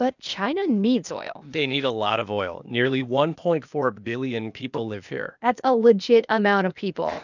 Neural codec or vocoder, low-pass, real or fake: codec, 16 kHz, 0.8 kbps, ZipCodec; 7.2 kHz; fake